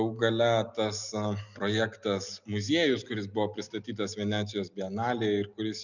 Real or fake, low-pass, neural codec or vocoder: real; 7.2 kHz; none